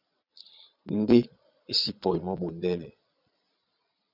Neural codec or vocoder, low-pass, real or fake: vocoder, 22.05 kHz, 80 mel bands, Vocos; 5.4 kHz; fake